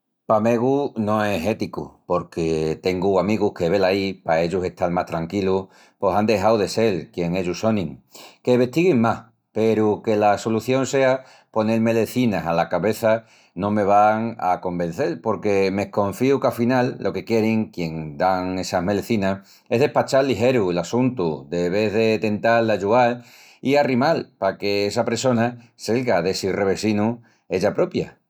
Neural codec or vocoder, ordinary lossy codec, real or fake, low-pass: none; none; real; 19.8 kHz